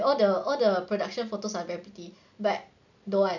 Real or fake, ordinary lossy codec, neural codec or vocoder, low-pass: real; none; none; 7.2 kHz